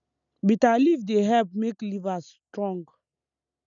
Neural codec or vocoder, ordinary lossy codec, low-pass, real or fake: none; none; 7.2 kHz; real